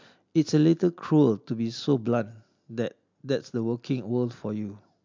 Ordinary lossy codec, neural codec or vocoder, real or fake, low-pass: MP3, 64 kbps; none; real; 7.2 kHz